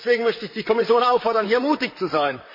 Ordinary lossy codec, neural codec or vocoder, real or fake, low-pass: MP3, 24 kbps; vocoder, 44.1 kHz, 128 mel bands, Pupu-Vocoder; fake; 5.4 kHz